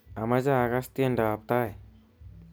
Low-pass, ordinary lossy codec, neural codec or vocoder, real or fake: none; none; none; real